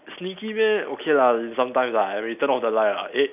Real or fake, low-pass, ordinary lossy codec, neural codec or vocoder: real; 3.6 kHz; none; none